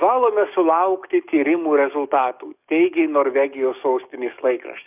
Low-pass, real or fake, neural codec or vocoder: 3.6 kHz; fake; codec, 44.1 kHz, 7.8 kbps, DAC